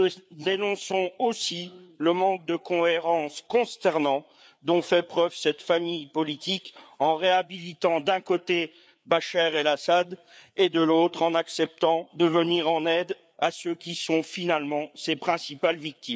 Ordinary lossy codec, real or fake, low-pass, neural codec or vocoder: none; fake; none; codec, 16 kHz, 4 kbps, FreqCodec, larger model